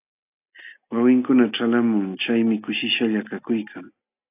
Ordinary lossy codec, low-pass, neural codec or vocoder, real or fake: AAC, 32 kbps; 3.6 kHz; none; real